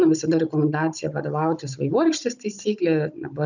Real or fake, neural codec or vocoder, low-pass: fake; codec, 16 kHz, 16 kbps, FunCodec, trained on Chinese and English, 50 frames a second; 7.2 kHz